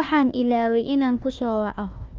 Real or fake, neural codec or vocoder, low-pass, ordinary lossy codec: fake; codec, 16 kHz, 1 kbps, FunCodec, trained on Chinese and English, 50 frames a second; 7.2 kHz; Opus, 24 kbps